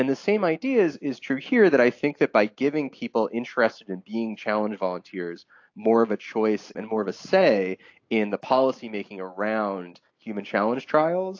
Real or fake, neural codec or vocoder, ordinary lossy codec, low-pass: real; none; AAC, 48 kbps; 7.2 kHz